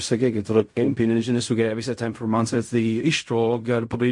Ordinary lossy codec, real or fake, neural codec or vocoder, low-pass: MP3, 48 kbps; fake; codec, 16 kHz in and 24 kHz out, 0.4 kbps, LongCat-Audio-Codec, fine tuned four codebook decoder; 10.8 kHz